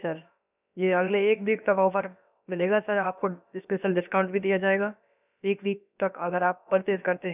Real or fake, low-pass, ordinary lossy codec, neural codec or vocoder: fake; 3.6 kHz; none; codec, 16 kHz, about 1 kbps, DyCAST, with the encoder's durations